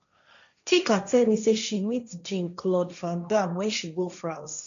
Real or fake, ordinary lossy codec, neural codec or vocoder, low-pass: fake; none; codec, 16 kHz, 1.1 kbps, Voila-Tokenizer; 7.2 kHz